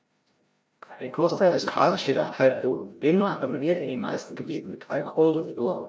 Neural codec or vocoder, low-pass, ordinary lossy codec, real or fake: codec, 16 kHz, 0.5 kbps, FreqCodec, larger model; none; none; fake